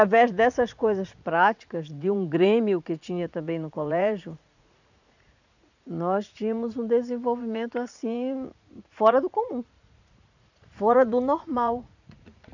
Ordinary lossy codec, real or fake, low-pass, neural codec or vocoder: none; fake; 7.2 kHz; vocoder, 44.1 kHz, 80 mel bands, Vocos